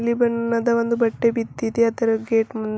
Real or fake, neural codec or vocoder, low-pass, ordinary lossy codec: real; none; none; none